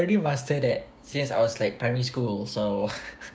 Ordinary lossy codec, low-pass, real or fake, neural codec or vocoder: none; none; fake; codec, 16 kHz, 6 kbps, DAC